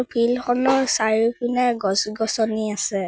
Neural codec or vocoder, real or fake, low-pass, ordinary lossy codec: none; real; none; none